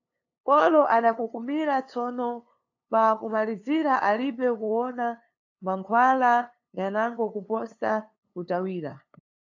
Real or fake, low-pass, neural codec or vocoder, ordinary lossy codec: fake; 7.2 kHz; codec, 16 kHz, 2 kbps, FunCodec, trained on LibriTTS, 25 frames a second; AAC, 48 kbps